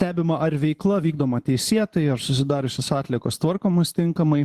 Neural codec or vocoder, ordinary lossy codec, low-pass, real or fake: vocoder, 44.1 kHz, 128 mel bands every 512 samples, BigVGAN v2; Opus, 16 kbps; 14.4 kHz; fake